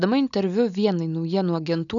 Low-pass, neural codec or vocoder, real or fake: 7.2 kHz; none; real